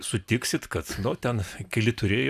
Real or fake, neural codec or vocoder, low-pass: real; none; 14.4 kHz